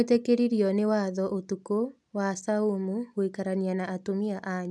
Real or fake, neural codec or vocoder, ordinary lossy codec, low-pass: real; none; none; none